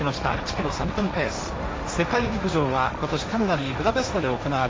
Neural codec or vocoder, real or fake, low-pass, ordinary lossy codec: codec, 16 kHz, 1.1 kbps, Voila-Tokenizer; fake; none; none